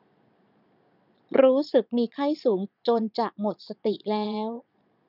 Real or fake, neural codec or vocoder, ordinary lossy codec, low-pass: fake; vocoder, 22.05 kHz, 80 mel bands, Vocos; none; 5.4 kHz